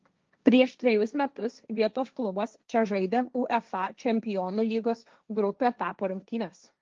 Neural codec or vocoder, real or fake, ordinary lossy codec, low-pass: codec, 16 kHz, 1.1 kbps, Voila-Tokenizer; fake; Opus, 24 kbps; 7.2 kHz